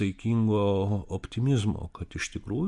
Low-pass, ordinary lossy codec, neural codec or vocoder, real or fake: 10.8 kHz; MP3, 64 kbps; none; real